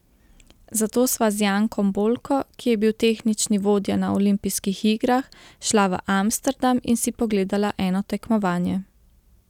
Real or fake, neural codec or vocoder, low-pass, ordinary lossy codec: real; none; 19.8 kHz; none